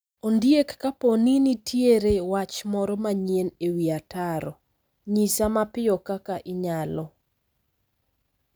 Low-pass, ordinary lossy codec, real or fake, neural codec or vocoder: none; none; real; none